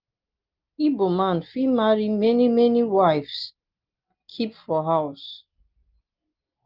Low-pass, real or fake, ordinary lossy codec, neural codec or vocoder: 5.4 kHz; real; Opus, 32 kbps; none